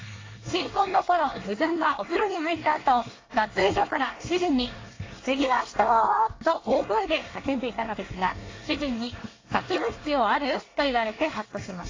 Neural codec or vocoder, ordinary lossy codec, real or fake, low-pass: codec, 24 kHz, 1 kbps, SNAC; AAC, 32 kbps; fake; 7.2 kHz